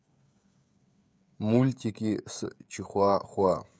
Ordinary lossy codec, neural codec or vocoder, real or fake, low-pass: none; codec, 16 kHz, 16 kbps, FreqCodec, smaller model; fake; none